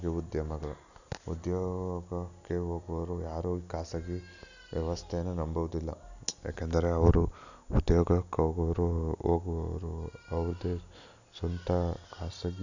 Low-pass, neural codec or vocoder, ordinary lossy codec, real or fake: 7.2 kHz; none; none; real